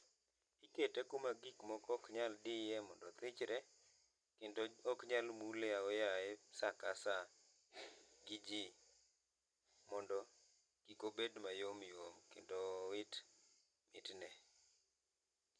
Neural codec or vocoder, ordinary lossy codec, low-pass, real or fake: none; none; 9.9 kHz; real